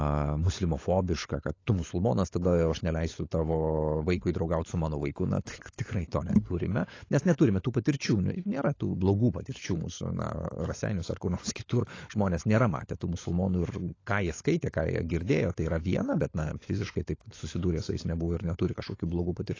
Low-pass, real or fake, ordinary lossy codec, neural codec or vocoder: 7.2 kHz; fake; AAC, 32 kbps; codec, 16 kHz, 16 kbps, FunCodec, trained on LibriTTS, 50 frames a second